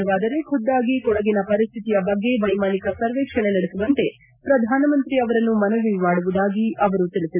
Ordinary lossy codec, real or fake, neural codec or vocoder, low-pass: none; real; none; 3.6 kHz